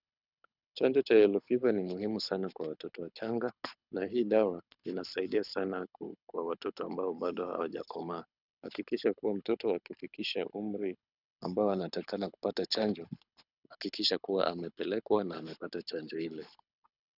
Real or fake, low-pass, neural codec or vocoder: fake; 5.4 kHz; codec, 24 kHz, 6 kbps, HILCodec